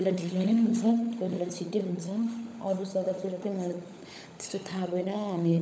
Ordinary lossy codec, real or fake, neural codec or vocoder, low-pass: none; fake; codec, 16 kHz, 16 kbps, FunCodec, trained on LibriTTS, 50 frames a second; none